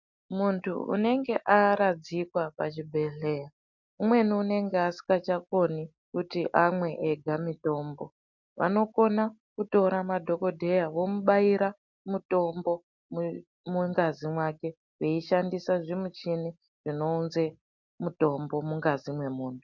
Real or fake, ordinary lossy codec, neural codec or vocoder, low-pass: real; MP3, 64 kbps; none; 7.2 kHz